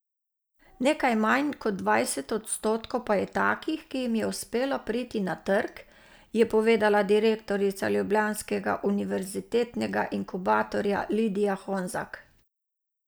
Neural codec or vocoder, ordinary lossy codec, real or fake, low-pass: none; none; real; none